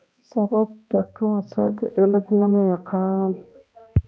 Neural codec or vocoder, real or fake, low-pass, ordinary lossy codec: codec, 16 kHz, 1 kbps, X-Codec, HuBERT features, trained on balanced general audio; fake; none; none